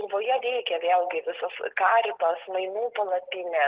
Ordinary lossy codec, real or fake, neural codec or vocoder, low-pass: Opus, 16 kbps; real; none; 3.6 kHz